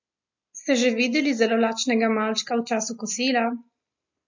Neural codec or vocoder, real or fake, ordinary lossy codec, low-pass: none; real; MP3, 48 kbps; 7.2 kHz